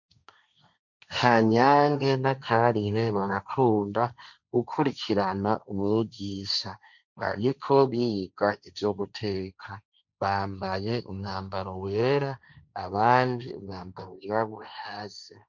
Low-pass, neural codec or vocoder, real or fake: 7.2 kHz; codec, 16 kHz, 1.1 kbps, Voila-Tokenizer; fake